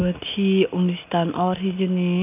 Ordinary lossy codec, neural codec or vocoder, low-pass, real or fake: none; none; 3.6 kHz; real